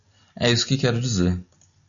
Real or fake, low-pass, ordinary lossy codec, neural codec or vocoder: real; 7.2 kHz; MP3, 96 kbps; none